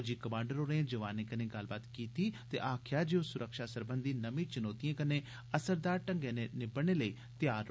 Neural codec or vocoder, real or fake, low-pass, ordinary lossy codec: none; real; none; none